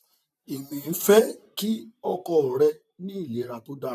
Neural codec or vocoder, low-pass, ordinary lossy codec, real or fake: vocoder, 44.1 kHz, 128 mel bands, Pupu-Vocoder; 14.4 kHz; none; fake